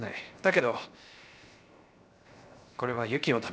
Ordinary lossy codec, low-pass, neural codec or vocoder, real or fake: none; none; codec, 16 kHz, 0.7 kbps, FocalCodec; fake